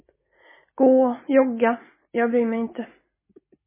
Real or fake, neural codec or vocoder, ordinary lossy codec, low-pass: real; none; MP3, 16 kbps; 3.6 kHz